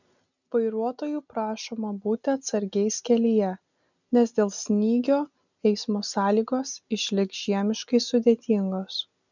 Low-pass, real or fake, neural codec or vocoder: 7.2 kHz; real; none